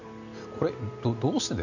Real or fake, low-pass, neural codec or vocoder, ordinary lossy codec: real; 7.2 kHz; none; none